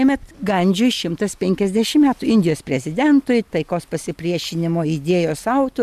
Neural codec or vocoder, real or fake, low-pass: none; real; 14.4 kHz